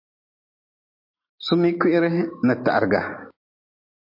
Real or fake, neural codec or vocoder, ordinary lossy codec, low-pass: real; none; MP3, 32 kbps; 5.4 kHz